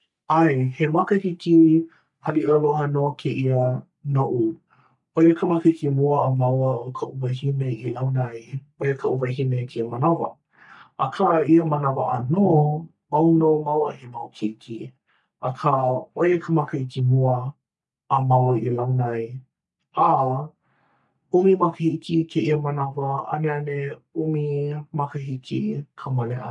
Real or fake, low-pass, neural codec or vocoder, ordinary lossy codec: fake; 10.8 kHz; codec, 44.1 kHz, 3.4 kbps, Pupu-Codec; none